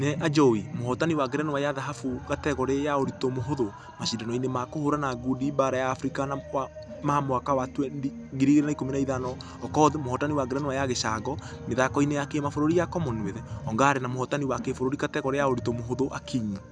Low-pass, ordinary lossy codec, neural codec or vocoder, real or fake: 9.9 kHz; none; none; real